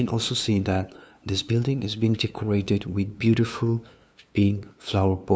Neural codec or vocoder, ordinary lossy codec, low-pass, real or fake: codec, 16 kHz, 2 kbps, FunCodec, trained on LibriTTS, 25 frames a second; none; none; fake